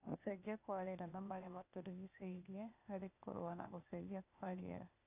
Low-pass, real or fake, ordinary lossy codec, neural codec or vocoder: 3.6 kHz; fake; none; codec, 16 kHz, 0.8 kbps, ZipCodec